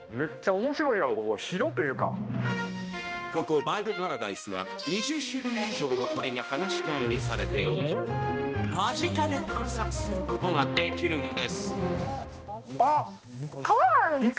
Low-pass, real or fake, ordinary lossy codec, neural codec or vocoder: none; fake; none; codec, 16 kHz, 1 kbps, X-Codec, HuBERT features, trained on balanced general audio